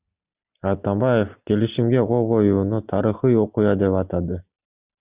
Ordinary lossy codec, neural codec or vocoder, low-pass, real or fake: Opus, 64 kbps; none; 3.6 kHz; real